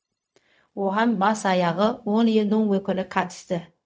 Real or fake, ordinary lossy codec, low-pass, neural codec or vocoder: fake; none; none; codec, 16 kHz, 0.4 kbps, LongCat-Audio-Codec